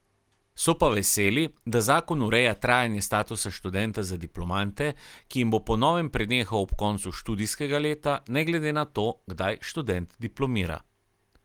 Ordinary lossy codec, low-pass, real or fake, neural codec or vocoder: Opus, 24 kbps; 19.8 kHz; real; none